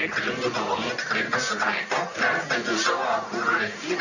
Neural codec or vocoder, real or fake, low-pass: codec, 44.1 kHz, 1.7 kbps, Pupu-Codec; fake; 7.2 kHz